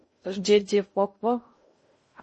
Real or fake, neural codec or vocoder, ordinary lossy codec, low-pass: fake; codec, 16 kHz in and 24 kHz out, 0.6 kbps, FocalCodec, streaming, 2048 codes; MP3, 32 kbps; 10.8 kHz